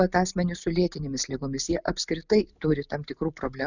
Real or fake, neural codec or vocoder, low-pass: real; none; 7.2 kHz